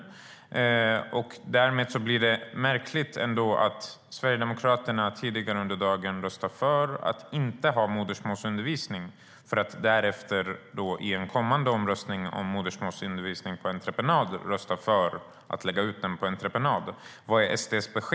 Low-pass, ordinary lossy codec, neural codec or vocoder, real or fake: none; none; none; real